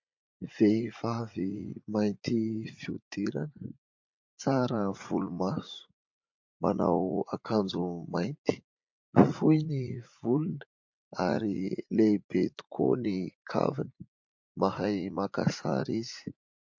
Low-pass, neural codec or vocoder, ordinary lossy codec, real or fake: 7.2 kHz; vocoder, 44.1 kHz, 80 mel bands, Vocos; MP3, 48 kbps; fake